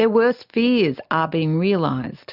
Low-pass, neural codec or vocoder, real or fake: 5.4 kHz; none; real